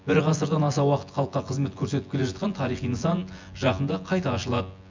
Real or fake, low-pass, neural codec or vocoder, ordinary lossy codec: fake; 7.2 kHz; vocoder, 24 kHz, 100 mel bands, Vocos; MP3, 64 kbps